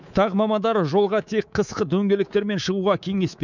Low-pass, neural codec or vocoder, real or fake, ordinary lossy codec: 7.2 kHz; codec, 24 kHz, 3.1 kbps, DualCodec; fake; none